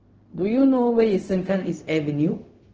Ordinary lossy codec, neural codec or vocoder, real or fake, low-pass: Opus, 16 kbps; codec, 16 kHz, 0.4 kbps, LongCat-Audio-Codec; fake; 7.2 kHz